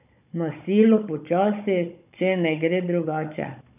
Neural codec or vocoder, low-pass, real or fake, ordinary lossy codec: codec, 16 kHz, 16 kbps, FunCodec, trained on Chinese and English, 50 frames a second; 3.6 kHz; fake; none